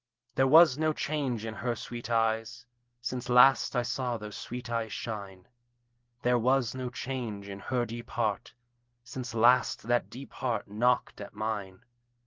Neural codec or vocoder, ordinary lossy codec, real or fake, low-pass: none; Opus, 16 kbps; real; 7.2 kHz